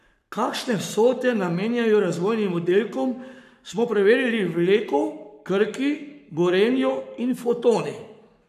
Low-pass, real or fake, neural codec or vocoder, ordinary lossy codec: 14.4 kHz; fake; codec, 44.1 kHz, 7.8 kbps, Pupu-Codec; none